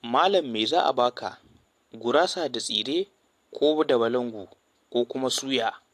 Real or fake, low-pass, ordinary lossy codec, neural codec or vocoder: real; 14.4 kHz; AAC, 64 kbps; none